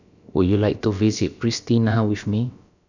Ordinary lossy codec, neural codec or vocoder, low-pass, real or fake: none; codec, 16 kHz, about 1 kbps, DyCAST, with the encoder's durations; 7.2 kHz; fake